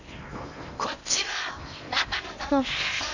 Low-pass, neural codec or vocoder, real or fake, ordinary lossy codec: 7.2 kHz; codec, 16 kHz in and 24 kHz out, 0.8 kbps, FocalCodec, streaming, 65536 codes; fake; none